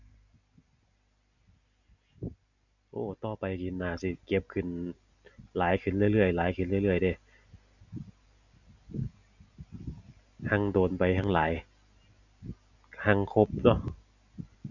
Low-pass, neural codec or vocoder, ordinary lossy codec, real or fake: 7.2 kHz; none; none; real